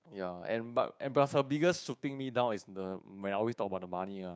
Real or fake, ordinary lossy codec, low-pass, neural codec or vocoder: fake; none; none; codec, 16 kHz, 6 kbps, DAC